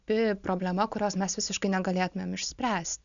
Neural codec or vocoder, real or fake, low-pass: none; real; 7.2 kHz